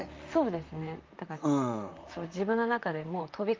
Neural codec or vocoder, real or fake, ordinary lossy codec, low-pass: vocoder, 44.1 kHz, 128 mel bands, Pupu-Vocoder; fake; Opus, 24 kbps; 7.2 kHz